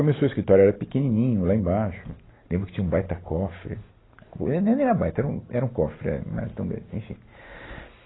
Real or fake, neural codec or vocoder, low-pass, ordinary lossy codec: real; none; 7.2 kHz; AAC, 16 kbps